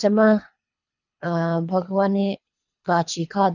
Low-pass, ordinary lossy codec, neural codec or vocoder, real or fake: 7.2 kHz; none; codec, 24 kHz, 3 kbps, HILCodec; fake